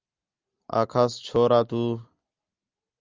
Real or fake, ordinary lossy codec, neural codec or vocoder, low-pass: real; Opus, 24 kbps; none; 7.2 kHz